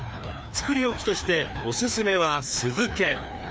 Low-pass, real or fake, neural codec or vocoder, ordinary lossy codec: none; fake; codec, 16 kHz, 2 kbps, FreqCodec, larger model; none